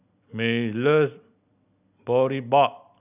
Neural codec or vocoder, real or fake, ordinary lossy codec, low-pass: none; real; none; 3.6 kHz